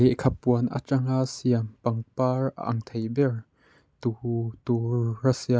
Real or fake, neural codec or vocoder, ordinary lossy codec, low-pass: real; none; none; none